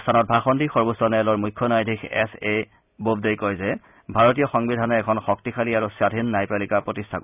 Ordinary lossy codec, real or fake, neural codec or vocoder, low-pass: none; real; none; 3.6 kHz